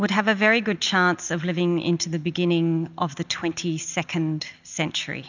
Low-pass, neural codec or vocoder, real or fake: 7.2 kHz; none; real